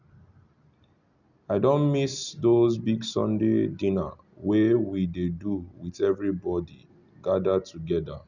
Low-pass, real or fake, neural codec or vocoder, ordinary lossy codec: 7.2 kHz; real; none; none